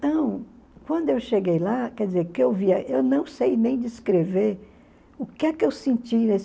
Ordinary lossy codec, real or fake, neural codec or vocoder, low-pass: none; real; none; none